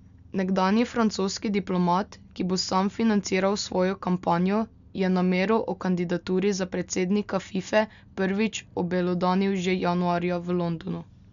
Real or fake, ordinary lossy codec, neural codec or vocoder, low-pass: real; none; none; 7.2 kHz